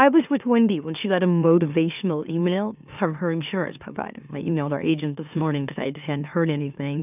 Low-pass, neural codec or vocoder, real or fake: 3.6 kHz; autoencoder, 44.1 kHz, a latent of 192 numbers a frame, MeloTTS; fake